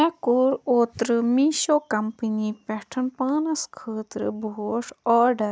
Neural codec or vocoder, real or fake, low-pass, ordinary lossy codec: none; real; none; none